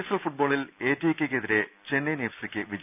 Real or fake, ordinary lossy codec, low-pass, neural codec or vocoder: real; none; 3.6 kHz; none